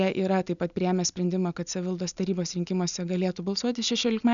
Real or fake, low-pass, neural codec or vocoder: real; 7.2 kHz; none